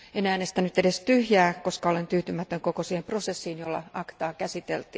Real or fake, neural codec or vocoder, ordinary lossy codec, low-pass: real; none; none; none